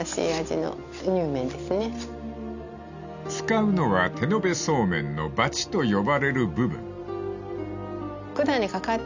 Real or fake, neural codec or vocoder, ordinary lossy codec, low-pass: real; none; none; 7.2 kHz